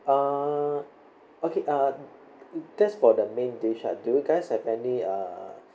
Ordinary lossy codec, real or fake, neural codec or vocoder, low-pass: none; real; none; none